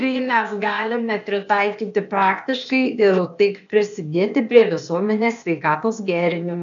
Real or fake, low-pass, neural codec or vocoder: fake; 7.2 kHz; codec, 16 kHz, 0.8 kbps, ZipCodec